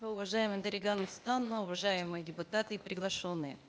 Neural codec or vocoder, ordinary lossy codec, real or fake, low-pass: codec, 16 kHz, 0.8 kbps, ZipCodec; none; fake; none